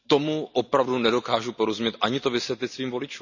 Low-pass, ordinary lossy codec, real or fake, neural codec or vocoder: 7.2 kHz; none; real; none